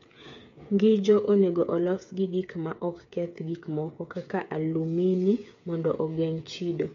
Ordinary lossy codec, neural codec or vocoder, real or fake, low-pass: MP3, 48 kbps; codec, 16 kHz, 8 kbps, FreqCodec, smaller model; fake; 7.2 kHz